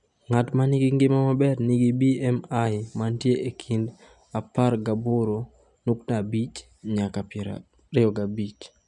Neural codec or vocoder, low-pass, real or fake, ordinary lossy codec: none; 10.8 kHz; real; none